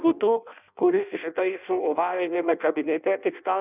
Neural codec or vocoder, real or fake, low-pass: codec, 16 kHz in and 24 kHz out, 0.6 kbps, FireRedTTS-2 codec; fake; 3.6 kHz